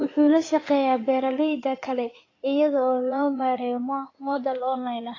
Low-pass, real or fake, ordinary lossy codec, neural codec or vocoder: 7.2 kHz; fake; AAC, 32 kbps; codec, 16 kHz in and 24 kHz out, 2.2 kbps, FireRedTTS-2 codec